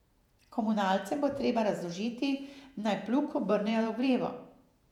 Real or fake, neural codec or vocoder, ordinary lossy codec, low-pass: fake; vocoder, 48 kHz, 128 mel bands, Vocos; none; 19.8 kHz